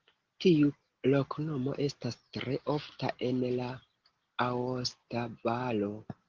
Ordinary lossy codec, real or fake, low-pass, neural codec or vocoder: Opus, 16 kbps; real; 7.2 kHz; none